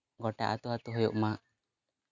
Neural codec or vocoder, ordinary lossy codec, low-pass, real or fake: none; none; 7.2 kHz; real